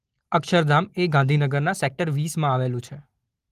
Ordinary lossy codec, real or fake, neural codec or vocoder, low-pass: Opus, 24 kbps; real; none; 14.4 kHz